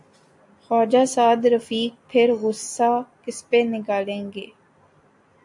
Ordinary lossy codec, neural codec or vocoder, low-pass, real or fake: AAC, 64 kbps; none; 10.8 kHz; real